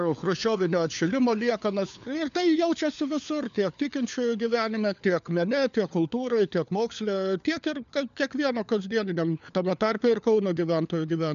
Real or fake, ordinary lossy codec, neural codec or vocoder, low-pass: fake; MP3, 96 kbps; codec, 16 kHz, 4 kbps, FunCodec, trained on LibriTTS, 50 frames a second; 7.2 kHz